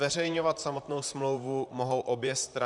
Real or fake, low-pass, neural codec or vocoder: fake; 10.8 kHz; vocoder, 44.1 kHz, 128 mel bands, Pupu-Vocoder